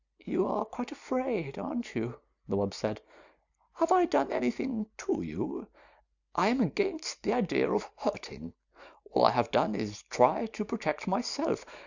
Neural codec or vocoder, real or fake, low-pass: none; real; 7.2 kHz